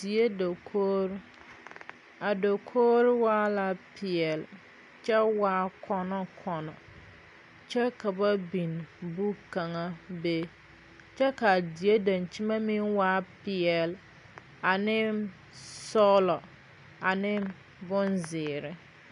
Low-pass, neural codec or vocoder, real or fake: 10.8 kHz; none; real